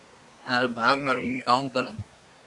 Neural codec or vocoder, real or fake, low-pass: codec, 24 kHz, 1 kbps, SNAC; fake; 10.8 kHz